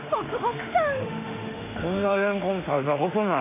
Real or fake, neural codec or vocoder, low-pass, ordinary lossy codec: fake; autoencoder, 48 kHz, 32 numbers a frame, DAC-VAE, trained on Japanese speech; 3.6 kHz; MP3, 24 kbps